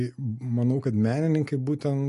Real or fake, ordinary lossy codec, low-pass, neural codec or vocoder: real; MP3, 48 kbps; 14.4 kHz; none